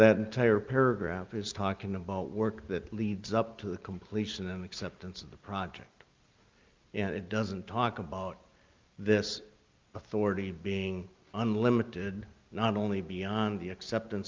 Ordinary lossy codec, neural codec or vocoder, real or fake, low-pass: Opus, 24 kbps; none; real; 7.2 kHz